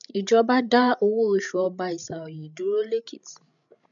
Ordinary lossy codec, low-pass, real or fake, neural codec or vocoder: none; 7.2 kHz; fake; codec, 16 kHz, 16 kbps, FreqCodec, larger model